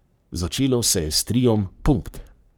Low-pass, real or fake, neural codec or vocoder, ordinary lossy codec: none; fake; codec, 44.1 kHz, 3.4 kbps, Pupu-Codec; none